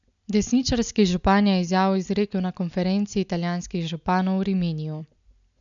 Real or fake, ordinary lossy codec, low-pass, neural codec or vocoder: real; none; 7.2 kHz; none